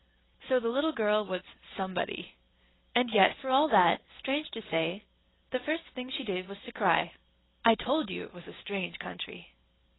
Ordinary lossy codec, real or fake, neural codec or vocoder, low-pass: AAC, 16 kbps; real; none; 7.2 kHz